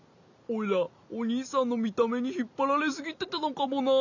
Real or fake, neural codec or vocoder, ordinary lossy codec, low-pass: real; none; none; 7.2 kHz